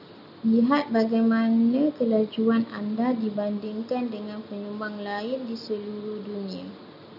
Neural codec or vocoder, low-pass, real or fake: none; 5.4 kHz; real